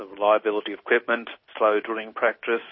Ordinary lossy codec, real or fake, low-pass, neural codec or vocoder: MP3, 24 kbps; real; 7.2 kHz; none